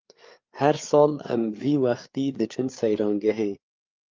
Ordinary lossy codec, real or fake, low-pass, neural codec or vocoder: Opus, 32 kbps; fake; 7.2 kHz; codec, 16 kHz, 4 kbps, FreqCodec, larger model